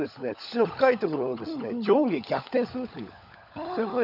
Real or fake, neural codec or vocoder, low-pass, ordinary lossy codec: fake; codec, 16 kHz, 16 kbps, FunCodec, trained on LibriTTS, 50 frames a second; 5.4 kHz; none